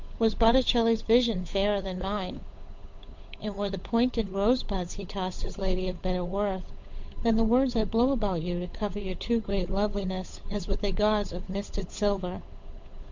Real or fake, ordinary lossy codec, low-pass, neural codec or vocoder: fake; MP3, 64 kbps; 7.2 kHz; codec, 16 kHz, 8 kbps, FunCodec, trained on Chinese and English, 25 frames a second